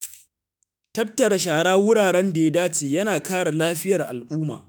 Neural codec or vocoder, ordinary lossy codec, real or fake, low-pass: autoencoder, 48 kHz, 32 numbers a frame, DAC-VAE, trained on Japanese speech; none; fake; none